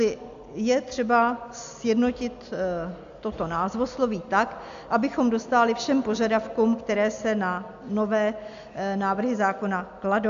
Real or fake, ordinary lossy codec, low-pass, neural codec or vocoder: real; AAC, 64 kbps; 7.2 kHz; none